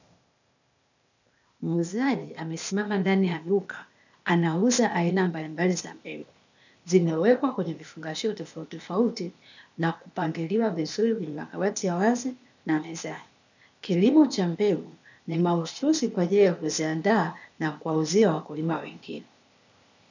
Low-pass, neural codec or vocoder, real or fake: 7.2 kHz; codec, 16 kHz, 0.8 kbps, ZipCodec; fake